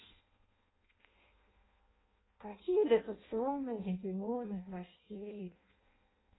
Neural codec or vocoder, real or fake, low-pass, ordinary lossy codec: codec, 16 kHz in and 24 kHz out, 0.6 kbps, FireRedTTS-2 codec; fake; 7.2 kHz; AAC, 16 kbps